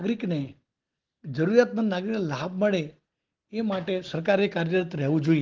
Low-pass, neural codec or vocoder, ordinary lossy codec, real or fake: 7.2 kHz; none; Opus, 16 kbps; real